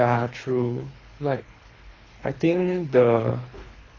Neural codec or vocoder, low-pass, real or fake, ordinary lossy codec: codec, 24 kHz, 3 kbps, HILCodec; 7.2 kHz; fake; AAC, 32 kbps